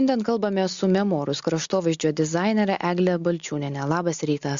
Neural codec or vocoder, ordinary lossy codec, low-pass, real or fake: none; Opus, 64 kbps; 7.2 kHz; real